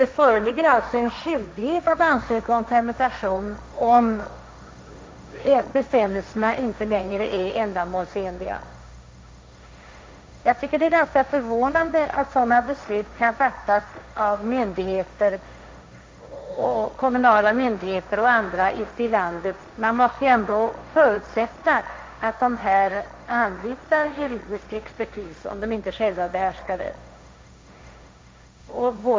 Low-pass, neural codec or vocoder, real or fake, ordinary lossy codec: none; codec, 16 kHz, 1.1 kbps, Voila-Tokenizer; fake; none